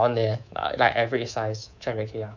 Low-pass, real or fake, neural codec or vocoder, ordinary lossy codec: 7.2 kHz; fake; codec, 24 kHz, 3.1 kbps, DualCodec; none